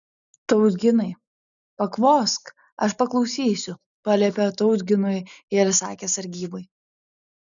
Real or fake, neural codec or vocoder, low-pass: real; none; 7.2 kHz